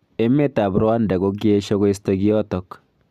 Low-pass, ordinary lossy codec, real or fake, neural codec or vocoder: 14.4 kHz; none; real; none